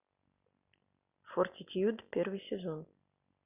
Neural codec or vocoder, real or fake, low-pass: none; real; 3.6 kHz